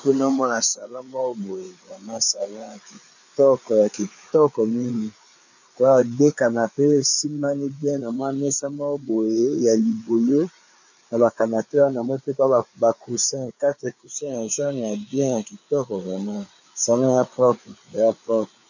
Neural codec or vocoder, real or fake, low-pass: codec, 16 kHz, 4 kbps, FreqCodec, larger model; fake; 7.2 kHz